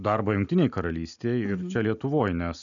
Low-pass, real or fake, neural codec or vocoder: 7.2 kHz; real; none